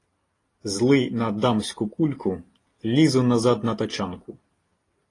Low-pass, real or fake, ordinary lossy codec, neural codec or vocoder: 10.8 kHz; real; AAC, 32 kbps; none